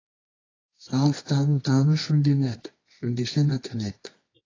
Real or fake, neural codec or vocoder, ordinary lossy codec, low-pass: fake; codec, 24 kHz, 0.9 kbps, WavTokenizer, medium music audio release; AAC, 32 kbps; 7.2 kHz